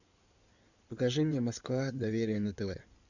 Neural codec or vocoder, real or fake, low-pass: codec, 16 kHz in and 24 kHz out, 2.2 kbps, FireRedTTS-2 codec; fake; 7.2 kHz